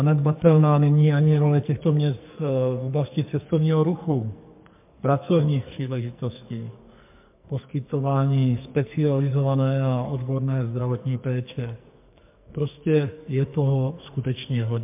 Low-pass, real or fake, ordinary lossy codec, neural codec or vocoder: 3.6 kHz; fake; MP3, 32 kbps; codec, 44.1 kHz, 2.6 kbps, SNAC